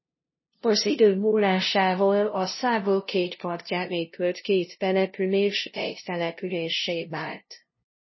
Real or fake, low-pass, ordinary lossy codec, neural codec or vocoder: fake; 7.2 kHz; MP3, 24 kbps; codec, 16 kHz, 0.5 kbps, FunCodec, trained on LibriTTS, 25 frames a second